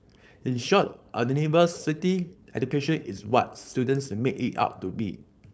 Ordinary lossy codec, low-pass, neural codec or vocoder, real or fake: none; none; codec, 16 kHz, 4.8 kbps, FACodec; fake